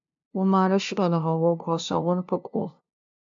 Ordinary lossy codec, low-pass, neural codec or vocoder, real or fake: AAC, 64 kbps; 7.2 kHz; codec, 16 kHz, 0.5 kbps, FunCodec, trained on LibriTTS, 25 frames a second; fake